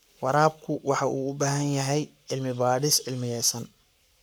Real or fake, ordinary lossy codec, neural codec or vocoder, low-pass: fake; none; codec, 44.1 kHz, 7.8 kbps, Pupu-Codec; none